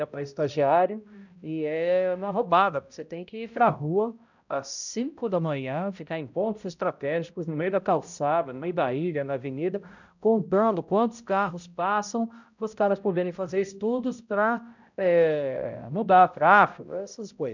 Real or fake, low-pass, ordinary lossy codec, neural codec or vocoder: fake; 7.2 kHz; none; codec, 16 kHz, 0.5 kbps, X-Codec, HuBERT features, trained on balanced general audio